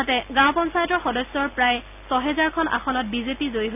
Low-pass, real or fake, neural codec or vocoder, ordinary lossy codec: 3.6 kHz; real; none; none